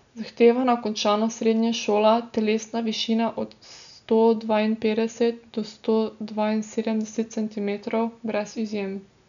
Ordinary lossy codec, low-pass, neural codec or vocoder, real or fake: none; 7.2 kHz; none; real